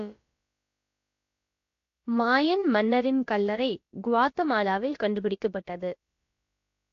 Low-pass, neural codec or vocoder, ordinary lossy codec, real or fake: 7.2 kHz; codec, 16 kHz, about 1 kbps, DyCAST, with the encoder's durations; AAC, 48 kbps; fake